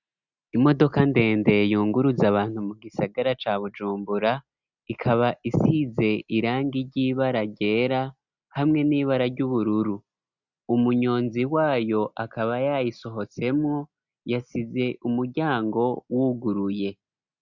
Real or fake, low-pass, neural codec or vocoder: real; 7.2 kHz; none